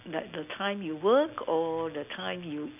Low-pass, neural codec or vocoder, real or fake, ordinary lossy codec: 3.6 kHz; none; real; none